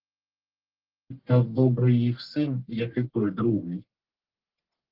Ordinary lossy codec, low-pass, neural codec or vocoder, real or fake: Opus, 16 kbps; 5.4 kHz; codec, 44.1 kHz, 1.7 kbps, Pupu-Codec; fake